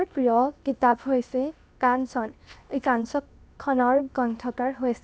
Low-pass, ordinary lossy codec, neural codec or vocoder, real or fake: none; none; codec, 16 kHz, 0.7 kbps, FocalCodec; fake